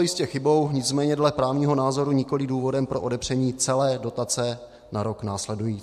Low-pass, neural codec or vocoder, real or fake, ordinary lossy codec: 14.4 kHz; none; real; MP3, 64 kbps